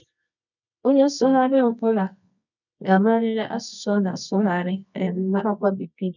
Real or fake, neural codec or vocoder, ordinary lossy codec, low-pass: fake; codec, 24 kHz, 0.9 kbps, WavTokenizer, medium music audio release; none; 7.2 kHz